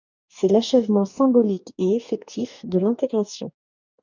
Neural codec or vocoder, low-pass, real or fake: codec, 44.1 kHz, 2.6 kbps, DAC; 7.2 kHz; fake